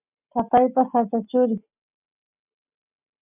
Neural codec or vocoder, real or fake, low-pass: none; real; 3.6 kHz